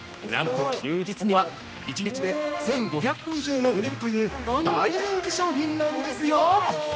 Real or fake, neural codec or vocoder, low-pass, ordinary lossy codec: fake; codec, 16 kHz, 1 kbps, X-Codec, HuBERT features, trained on general audio; none; none